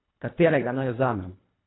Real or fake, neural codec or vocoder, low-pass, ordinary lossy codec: fake; codec, 24 kHz, 1.5 kbps, HILCodec; 7.2 kHz; AAC, 16 kbps